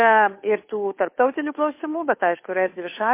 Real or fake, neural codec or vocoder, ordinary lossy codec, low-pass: fake; codec, 16 kHz, 2 kbps, FunCodec, trained on Chinese and English, 25 frames a second; MP3, 24 kbps; 3.6 kHz